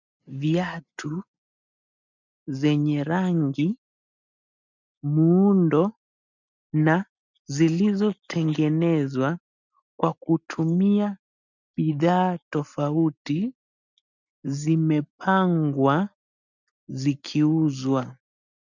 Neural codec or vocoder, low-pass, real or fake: none; 7.2 kHz; real